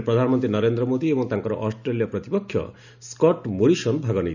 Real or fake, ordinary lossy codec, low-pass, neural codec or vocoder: real; none; 7.2 kHz; none